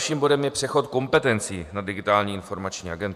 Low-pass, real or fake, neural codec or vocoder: 14.4 kHz; fake; vocoder, 48 kHz, 128 mel bands, Vocos